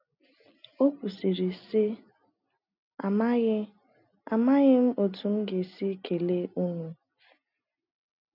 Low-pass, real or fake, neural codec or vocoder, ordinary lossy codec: 5.4 kHz; real; none; none